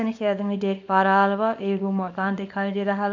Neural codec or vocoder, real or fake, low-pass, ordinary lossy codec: codec, 24 kHz, 0.9 kbps, WavTokenizer, small release; fake; 7.2 kHz; AAC, 48 kbps